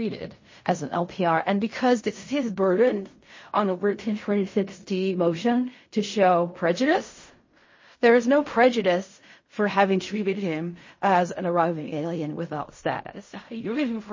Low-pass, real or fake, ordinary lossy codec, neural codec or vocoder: 7.2 kHz; fake; MP3, 32 kbps; codec, 16 kHz in and 24 kHz out, 0.4 kbps, LongCat-Audio-Codec, fine tuned four codebook decoder